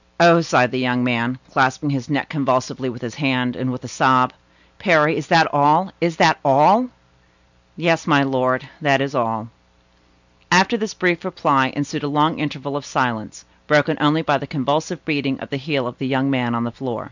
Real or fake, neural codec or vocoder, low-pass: real; none; 7.2 kHz